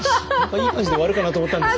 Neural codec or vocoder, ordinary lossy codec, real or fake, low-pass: none; none; real; none